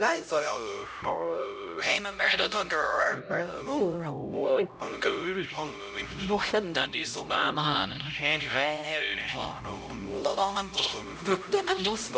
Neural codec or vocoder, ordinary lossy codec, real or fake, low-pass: codec, 16 kHz, 0.5 kbps, X-Codec, HuBERT features, trained on LibriSpeech; none; fake; none